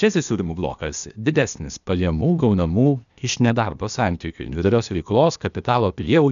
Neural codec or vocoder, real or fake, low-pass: codec, 16 kHz, 0.8 kbps, ZipCodec; fake; 7.2 kHz